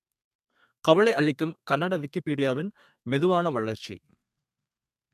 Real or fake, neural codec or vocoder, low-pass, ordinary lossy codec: fake; codec, 32 kHz, 1.9 kbps, SNAC; 14.4 kHz; MP3, 96 kbps